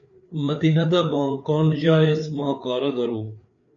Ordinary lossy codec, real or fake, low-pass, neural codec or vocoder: MP3, 64 kbps; fake; 7.2 kHz; codec, 16 kHz, 4 kbps, FreqCodec, larger model